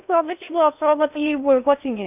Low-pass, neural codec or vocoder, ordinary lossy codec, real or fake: 3.6 kHz; codec, 16 kHz in and 24 kHz out, 0.6 kbps, FocalCodec, streaming, 2048 codes; none; fake